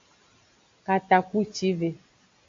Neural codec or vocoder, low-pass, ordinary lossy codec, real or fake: none; 7.2 kHz; AAC, 48 kbps; real